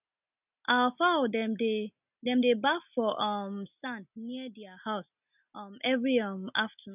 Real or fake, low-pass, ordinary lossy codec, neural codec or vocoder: real; 3.6 kHz; none; none